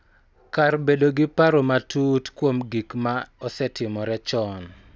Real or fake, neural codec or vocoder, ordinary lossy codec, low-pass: real; none; none; none